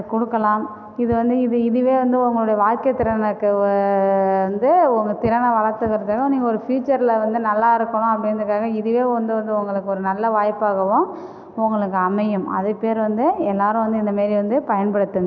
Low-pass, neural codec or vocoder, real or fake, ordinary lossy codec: none; none; real; none